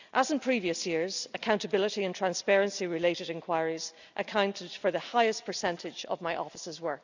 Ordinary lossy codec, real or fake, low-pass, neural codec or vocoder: none; real; 7.2 kHz; none